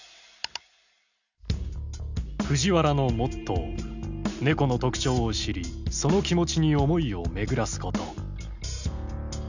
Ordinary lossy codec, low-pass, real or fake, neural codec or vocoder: none; 7.2 kHz; real; none